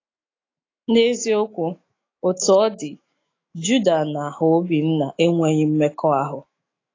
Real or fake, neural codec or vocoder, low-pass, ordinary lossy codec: fake; autoencoder, 48 kHz, 128 numbers a frame, DAC-VAE, trained on Japanese speech; 7.2 kHz; AAC, 32 kbps